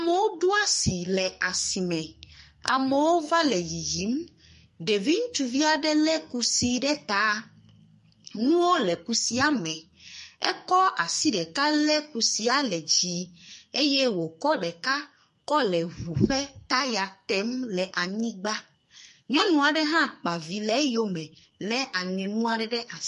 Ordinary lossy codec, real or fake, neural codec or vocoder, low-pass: MP3, 48 kbps; fake; codec, 44.1 kHz, 2.6 kbps, SNAC; 14.4 kHz